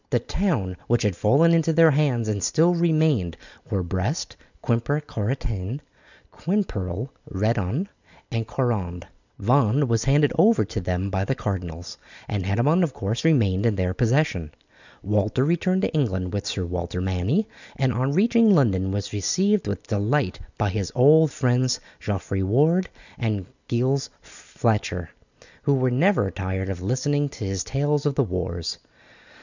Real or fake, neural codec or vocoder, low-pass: real; none; 7.2 kHz